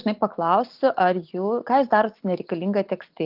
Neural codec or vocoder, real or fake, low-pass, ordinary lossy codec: none; real; 5.4 kHz; Opus, 32 kbps